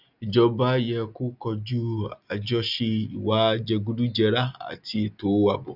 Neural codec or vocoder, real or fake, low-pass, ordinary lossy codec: none; real; 5.4 kHz; none